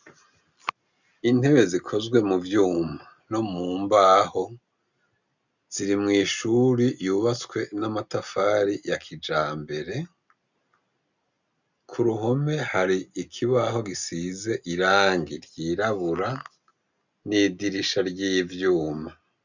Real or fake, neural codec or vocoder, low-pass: real; none; 7.2 kHz